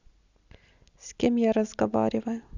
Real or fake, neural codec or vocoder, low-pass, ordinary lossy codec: real; none; 7.2 kHz; Opus, 64 kbps